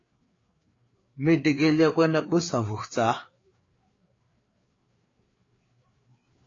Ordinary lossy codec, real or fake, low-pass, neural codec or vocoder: AAC, 32 kbps; fake; 7.2 kHz; codec, 16 kHz, 4 kbps, FreqCodec, larger model